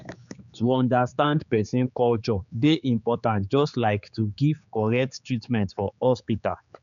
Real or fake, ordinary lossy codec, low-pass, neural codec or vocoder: fake; none; 7.2 kHz; codec, 16 kHz, 4 kbps, X-Codec, HuBERT features, trained on general audio